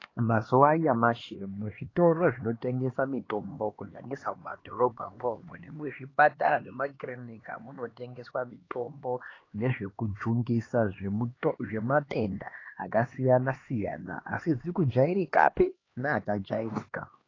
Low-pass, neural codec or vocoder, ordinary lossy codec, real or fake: 7.2 kHz; codec, 16 kHz, 2 kbps, X-Codec, HuBERT features, trained on LibriSpeech; AAC, 32 kbps; fake